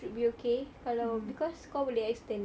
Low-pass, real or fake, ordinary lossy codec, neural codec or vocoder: none; real; none; none